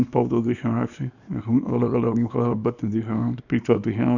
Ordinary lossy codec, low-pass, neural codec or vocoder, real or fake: none; 7.2 kHz; codec, 24 kHz, 0.9 kbps, WavTokenizer, small release; fake